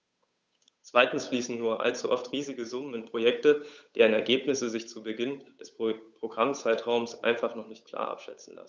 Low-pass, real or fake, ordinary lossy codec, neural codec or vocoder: none; fake; none; codec, 16 kHz, 8 kbps, FunCodec, trained on Chinese and English, 25 frames a second